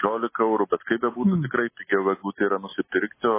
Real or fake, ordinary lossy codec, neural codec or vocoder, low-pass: real; MP3, 16 kbps; none; 3.6 kHz